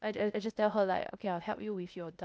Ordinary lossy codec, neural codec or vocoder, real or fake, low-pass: none; codec, 16 kHz, 0.8 kbps, ZipCodec; fake; none